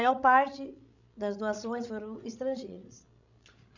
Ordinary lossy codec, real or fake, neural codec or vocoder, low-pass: none; fake; codec, 16 kHz, 16 kbps, FreqCodec, larger model; 7.2 kHz